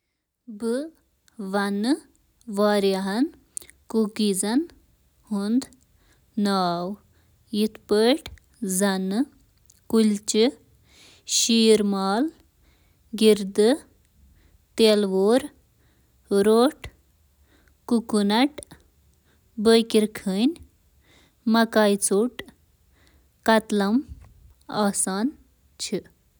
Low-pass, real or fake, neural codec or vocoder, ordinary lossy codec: none; real; none; none